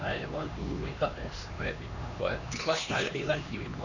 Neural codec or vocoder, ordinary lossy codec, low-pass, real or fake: codec, 16 kHz, 2 kbps, X-Codec, HuBERT features, trained on LibriSpeech; none; 7.2 kHz; fake